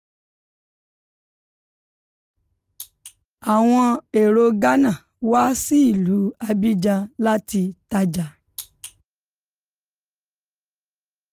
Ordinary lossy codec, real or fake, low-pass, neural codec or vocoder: Opus, 32 kbps; real; 14.4 kHz; none